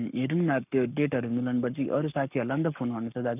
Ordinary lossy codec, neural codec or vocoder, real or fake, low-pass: none; codec, 44.1 kHz, 7.8 kbps, Pupu-Codec; fake; 3.6 kHz